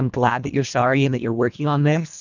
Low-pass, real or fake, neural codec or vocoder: 7.2 kHz; fake; codec, 24 kHz, 1.5 kbps, HILCodec